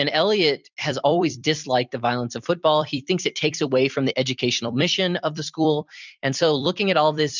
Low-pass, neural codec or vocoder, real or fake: 7.2 kHz; vocoder, 44.1 kHz, 128 mel bands every 256 samples, BigVGAN v2; fake